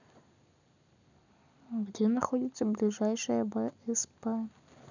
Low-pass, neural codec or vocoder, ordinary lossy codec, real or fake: 7.2 kHz; none; none; real